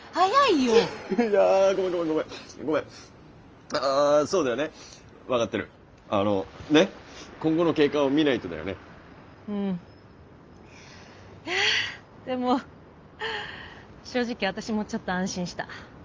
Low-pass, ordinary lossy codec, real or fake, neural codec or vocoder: 7.2 kHz; Opus, 24 kbps; real; none